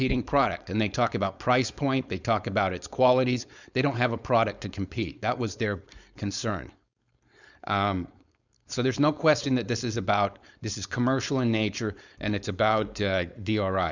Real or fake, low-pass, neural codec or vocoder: fake; 7.2 kHz; codec, 16 kHz, 4.8 kbps, FACodec